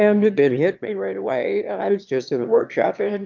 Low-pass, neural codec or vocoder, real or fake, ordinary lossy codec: 7.2 kHz; autoencoder, 22.05 kHz, a latent of 192 numbers a frame, VITS, trained on one speaker; fake; Opus, 24 kbps